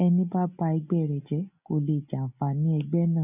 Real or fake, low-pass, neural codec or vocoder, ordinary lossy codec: real; 3.6 kHz; none; none